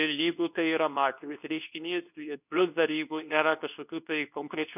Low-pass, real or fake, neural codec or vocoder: 3.6 kHz; fake; codec, 24 kHz, 0.9 kbps, WavTokenizer, medium speech release version 2